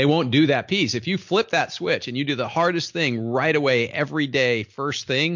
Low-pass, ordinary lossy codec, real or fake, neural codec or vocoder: 7.2 kHz; MP3, 48 kbps; real; none